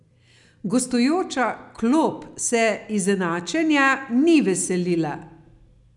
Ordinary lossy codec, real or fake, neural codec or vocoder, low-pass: none; real; none; 10.8 kHz